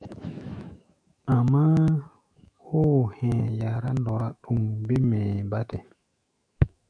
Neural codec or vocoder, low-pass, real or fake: autoencoder, 48 kHz, 128 numbers a frame, DAC-VAE, trained on Japanese speech; 9.9 kHz; fake